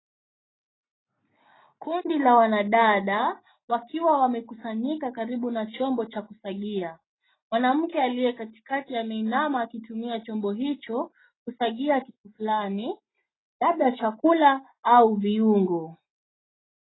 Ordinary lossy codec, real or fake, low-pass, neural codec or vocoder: AAC, 16 kbps; real; 7.2 kHz; none